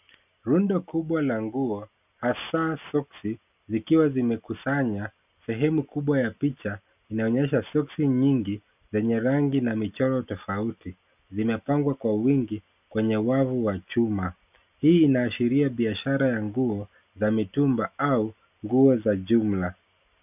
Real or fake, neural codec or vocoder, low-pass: real; none; 3.6 kHz